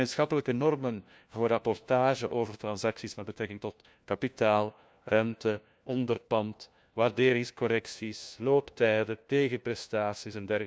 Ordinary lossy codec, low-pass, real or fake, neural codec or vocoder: none; none; fake; codec, 16 kHz, 1 kbps, FunCodec, trained on LibriTTS, 50 frames a second